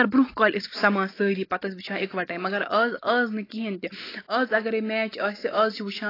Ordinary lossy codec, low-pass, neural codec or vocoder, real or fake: AAC, 24 kbps; 5.4 kHz; none; real